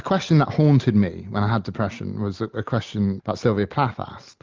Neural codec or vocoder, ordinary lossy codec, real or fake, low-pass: none; Opus, 24 kbps; real; 7.2 kHz